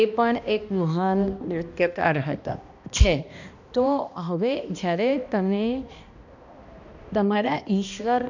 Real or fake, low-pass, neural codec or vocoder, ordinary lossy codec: fake; 7.2 kHz; codec, 16 kHz, 1 kbps, X-Codec, HuBERT features, trained on balanced general audio; none